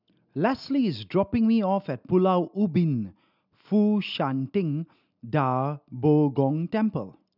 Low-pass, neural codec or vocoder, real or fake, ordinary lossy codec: 5.4 kHz; none; real; none